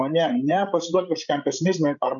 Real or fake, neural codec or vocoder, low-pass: fake; codec, 16 kHz, 16 kbps, FreqCodec, larger model; 7.2 kHz